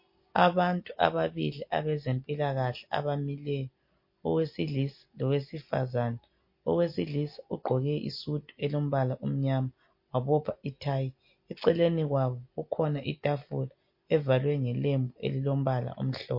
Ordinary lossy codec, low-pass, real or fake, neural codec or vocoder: MP3, 32 kbps; 5.4 kHz; real; none